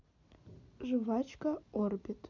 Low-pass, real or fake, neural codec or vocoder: 7.2 kHz; real; none